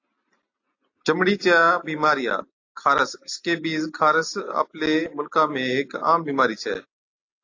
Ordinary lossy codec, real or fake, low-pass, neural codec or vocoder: AAC, 48 kbps; real; 7.2 kHz; none